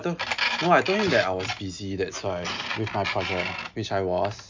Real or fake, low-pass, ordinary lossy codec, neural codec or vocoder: real; 7.2 kHz; MP3, 64 kbps; none